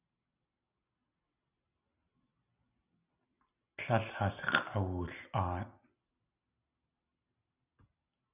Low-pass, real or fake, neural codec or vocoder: 3.6 kHz; real; none